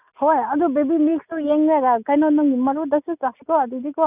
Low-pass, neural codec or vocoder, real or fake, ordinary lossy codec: 3.6 kHz; none; real; none